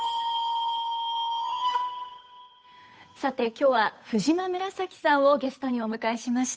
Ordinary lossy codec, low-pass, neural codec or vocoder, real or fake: none; none; codec, 16 kHz, 8 kbps, FunCodec, trained on Chinese and English, 25 frames a second; fake